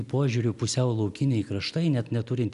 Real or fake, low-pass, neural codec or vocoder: real; 10.8 kHz; none